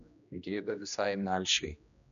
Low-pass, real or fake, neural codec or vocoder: 7.2 kHz; fake; codec, 16 kHz, 1 kbps, X-Codec, HuBERT features, trained on general audio